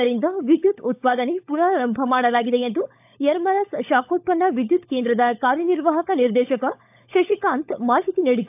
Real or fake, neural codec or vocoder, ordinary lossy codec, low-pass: fake; codec, 16 kHz, 16 kbps, FunCodec, trained on LibriTTS, 50 frames a second; AAC, 32 kbps; 3.6 kHz